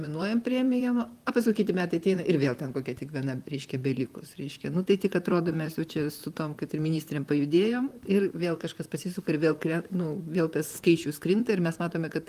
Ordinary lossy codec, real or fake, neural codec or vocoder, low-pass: Opus, 32 kbps; fake; vocoder, 44.1 kHz, 128 mel bands, Pupu-Vocoder; 14.4 kHz